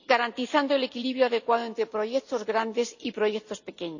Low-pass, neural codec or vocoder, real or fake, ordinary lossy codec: 7.2 kHz; none; real; none